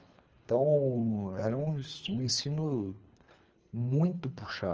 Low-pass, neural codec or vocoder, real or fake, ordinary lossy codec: 7.2 kHz; codec, 24 kHz, 3 kbps, HILCodec; fake; Opus, 24 kbps